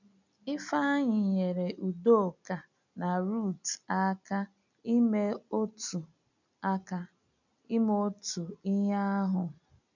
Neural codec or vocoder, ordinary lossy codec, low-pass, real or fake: none; none; 7.2 kHz; real